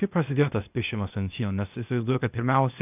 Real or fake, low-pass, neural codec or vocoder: fake; 3.6 kHz; codec, 16 kHz, 0.8 kbps, ZipCodec